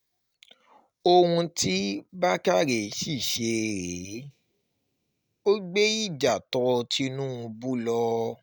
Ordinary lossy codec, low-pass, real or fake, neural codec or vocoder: none; none; real; none